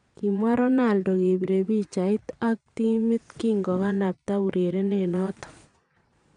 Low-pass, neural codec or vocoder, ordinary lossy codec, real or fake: 9.9 kHz; vocoder, 22.05 kHz, 80 mel bands, Vocos; none; fake